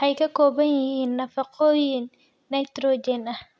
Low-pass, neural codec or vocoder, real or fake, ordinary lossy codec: none; none; real; none